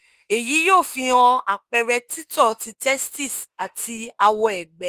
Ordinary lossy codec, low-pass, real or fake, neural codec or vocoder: Opus, 24 kbps; 14.4 kHz; fake; autoencoder, 48 kHz, 32 numbers a frame, DAC-VAE, trained on Japanese speech